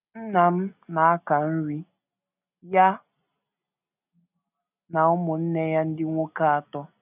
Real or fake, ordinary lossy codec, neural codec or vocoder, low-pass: real; none; none; 3.6 kHz